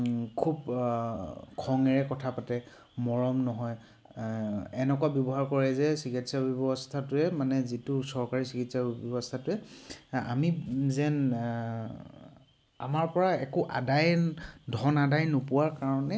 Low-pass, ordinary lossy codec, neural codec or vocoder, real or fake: none; none; none; real